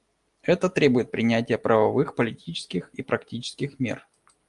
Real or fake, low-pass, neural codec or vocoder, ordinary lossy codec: real; 10.8 kHz; none; Opus, 32 kbps